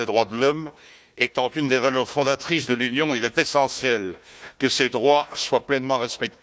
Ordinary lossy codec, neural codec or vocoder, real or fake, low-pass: none; codec, 16 kHz, 1 kbps, FunCodec, trained on Chinese and English, 50 frames a second; fake; none